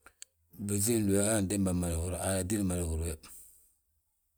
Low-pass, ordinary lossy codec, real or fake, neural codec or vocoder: none; none; real; none